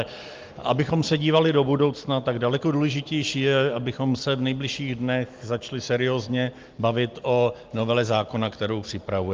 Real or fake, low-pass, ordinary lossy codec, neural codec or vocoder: real; 7.2 kHz; Opus, 32 kbps; none